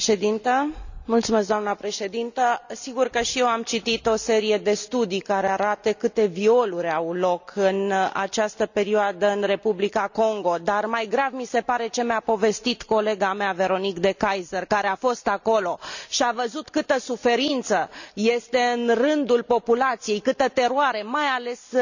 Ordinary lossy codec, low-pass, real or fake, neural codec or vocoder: none; 7.2 kHz; real; none